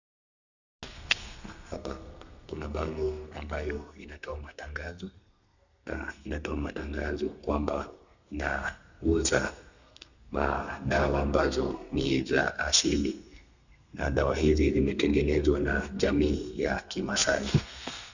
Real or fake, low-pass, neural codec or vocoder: fake; 7.2 kHz; codec, 32 kHz, 1.9 kbps, SNAC